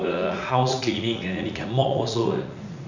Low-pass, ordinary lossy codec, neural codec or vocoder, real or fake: 7.2 kHz; none; vocoder, 44.1 kHz, 80 mel bands, Vocos; fake